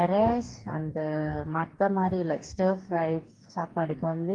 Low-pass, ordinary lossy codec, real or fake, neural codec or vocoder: 9.9 kHz; Opus, 24 kbps; fake; codec, 44.1 kHz, 2.6 kbps, DAC